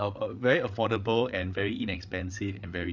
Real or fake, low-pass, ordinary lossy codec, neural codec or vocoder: fake; 7.2 kHz; none; codec, 16 kHz, 8 kbps, FreqCodec, larger model